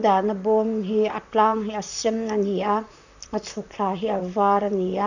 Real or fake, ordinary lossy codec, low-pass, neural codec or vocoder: fake; none; 7.2 kHz; vocoder, 44.1 kHz, 128 mel bands, Pupu-Vocoder